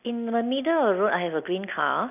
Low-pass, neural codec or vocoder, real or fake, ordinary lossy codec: 3.6 kHz; none; real; none